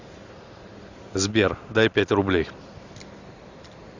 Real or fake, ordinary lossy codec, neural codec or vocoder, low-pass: real; Opus, 64 kbps; none; 7.2 kHz